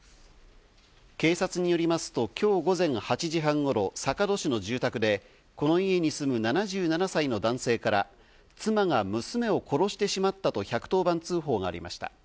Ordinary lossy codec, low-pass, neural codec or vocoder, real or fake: none; none; none; real